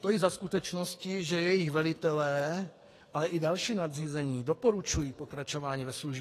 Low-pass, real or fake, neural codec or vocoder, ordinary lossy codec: 14.4 kHz; fake; codec, 44.1 kHz, 2.6 kbps, SNAC; AAC, 48 kbps